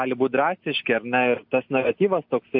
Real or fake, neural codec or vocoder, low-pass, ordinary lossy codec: real; none; 5.4 kHz; MP3, 48 kbps